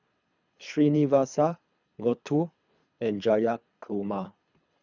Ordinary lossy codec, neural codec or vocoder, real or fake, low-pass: none; codec, 24 kHz, 3 kbps, HILCodec; fake; 7.2 kHz